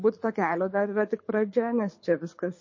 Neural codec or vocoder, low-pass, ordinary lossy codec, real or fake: codec, 24 kHz, 6 kbps, HILCodec; 7.2 kHz; MP3, 32 kbps; fake